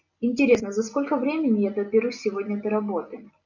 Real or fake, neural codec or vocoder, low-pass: real; none; 7.2 kHz